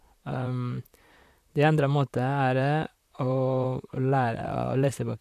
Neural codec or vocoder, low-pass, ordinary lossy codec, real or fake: vocoder, 44.1 kHz, 128 mel bands, Pupu-Vocoder; 14.4 kHz; AAC, 96 kbps; fake